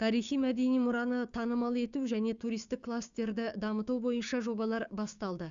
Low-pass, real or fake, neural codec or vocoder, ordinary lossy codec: 7.2 kHz; fake; codec, 16 kHz, 6 kbps, DAC; Opus, 64 kbps